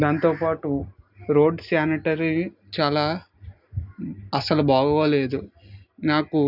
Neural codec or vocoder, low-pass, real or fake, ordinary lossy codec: none; 5.4 kHz; real; none